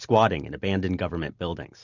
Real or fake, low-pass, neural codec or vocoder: real; 7.2 kHz; none